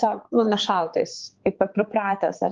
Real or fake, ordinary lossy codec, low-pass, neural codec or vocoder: fake; Opus, 32 kbps; 7.2 kHz; codec, 16 kHz, 4 kbps, X-Codec, HuBERT features, trained on balanced general audio